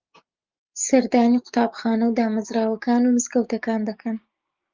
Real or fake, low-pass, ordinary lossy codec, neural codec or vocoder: fake; 7.2 kHz; Opus, 24 kbps; codec, 16 kHz, 6 kbps, DAC